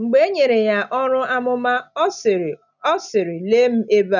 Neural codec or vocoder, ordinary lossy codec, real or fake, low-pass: none; none; real; 7.2 kHz